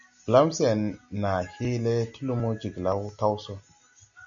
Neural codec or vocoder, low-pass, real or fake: none; 7.2 kHz; real